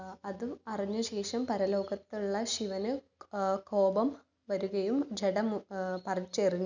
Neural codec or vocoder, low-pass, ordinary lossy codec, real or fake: none; 7.2 kHz; none; real